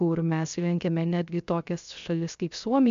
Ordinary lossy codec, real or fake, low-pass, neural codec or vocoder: MP3, 64 kbps; fake; 7.2 kHz; codec, 16 kHz, 0.3 kbps, FocalCodec